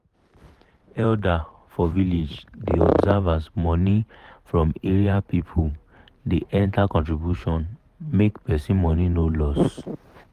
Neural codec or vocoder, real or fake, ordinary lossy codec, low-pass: vocoder, 44.1 kHz, 128 mel bands every 512 samples, BigVGAN v2; fake; Opus, 32 kbps; 14.4 kHz